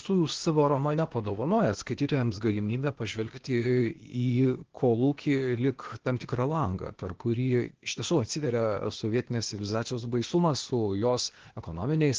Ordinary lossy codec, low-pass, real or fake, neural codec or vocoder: Opus, 16 kbps; 7.2 kHz; fake; codec, 16 kHz, 0.8 kbps, ZipCodec